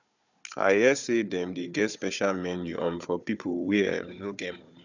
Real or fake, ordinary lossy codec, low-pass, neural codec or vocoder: fake; none; 7.2 kHz; codec, 16 kHz, 4 kbps, FunCodec, trained on Chinese and English, 50 frames a second